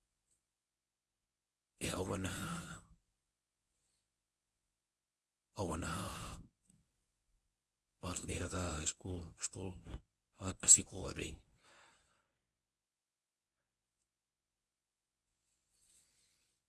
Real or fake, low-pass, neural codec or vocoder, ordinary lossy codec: fake; none; codec, 24 kHz, 0.9 kbps, WavTokenizer, medium speech release version 1; none